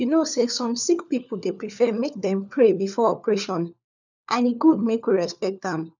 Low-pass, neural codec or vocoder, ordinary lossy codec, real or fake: 7.2 kHz; codec, 16 kHz, 4 kbps, FunCodec, trained on LibriTTS, 50 frames a second; none; fake